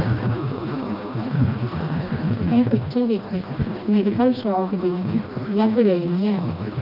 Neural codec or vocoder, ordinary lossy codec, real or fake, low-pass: codec, 16 kHz, 1 kbps, FreqCodec, smaller model; none; fake; 5.4 kHz